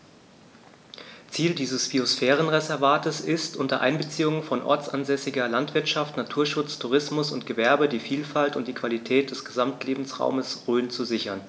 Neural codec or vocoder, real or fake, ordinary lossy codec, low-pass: none; real; none; none